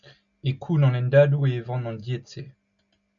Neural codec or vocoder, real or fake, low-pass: none; real; 7.2 kHz